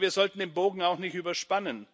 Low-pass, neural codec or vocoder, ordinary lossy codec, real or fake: none; none; none; real